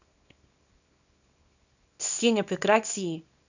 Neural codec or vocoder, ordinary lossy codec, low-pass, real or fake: codec, 24 kHz, 0.9 kbps, WavTokenizer, small release; none; 7.2 kHz; fake